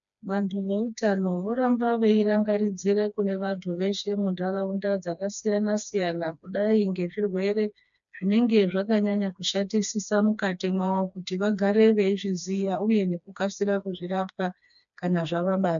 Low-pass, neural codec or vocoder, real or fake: 7.2 kHz; codec, 16 kHz, 2 kbps, FreqCodec, smaller model; fake